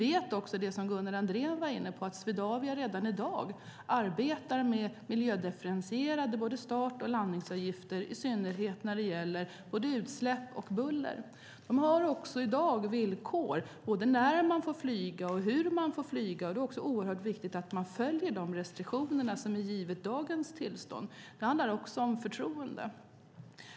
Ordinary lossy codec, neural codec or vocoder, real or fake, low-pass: none; none; real; none